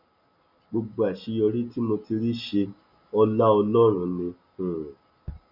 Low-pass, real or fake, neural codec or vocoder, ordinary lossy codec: 5.4 kHz; real; none; none